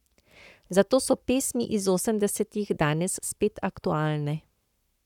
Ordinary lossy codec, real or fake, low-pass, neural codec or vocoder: none; fake; 19.8 kHz; codec, 44.1 kHz, 7.8 kbps, Pupu-Codec